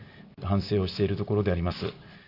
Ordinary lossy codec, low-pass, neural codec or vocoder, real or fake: none; 5.4 kHz; none; real